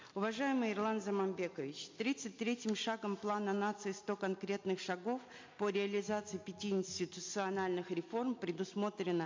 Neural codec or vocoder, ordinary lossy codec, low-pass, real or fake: none; MP3, 48 kbps; 7.2 kHz; real